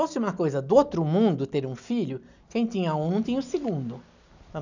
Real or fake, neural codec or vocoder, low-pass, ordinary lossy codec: real; none; 7.2 kHz; none